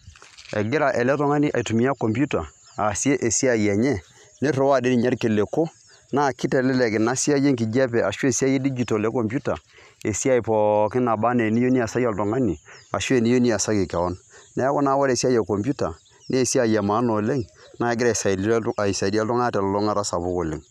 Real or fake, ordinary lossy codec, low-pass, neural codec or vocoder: real; none; 14.4 kHz; none